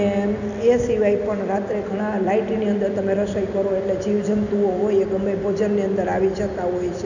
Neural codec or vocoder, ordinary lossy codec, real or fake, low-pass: none; none; real; 7.2 kHz